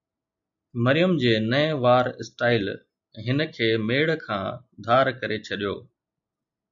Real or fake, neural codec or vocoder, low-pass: real; none; 7.2 kHz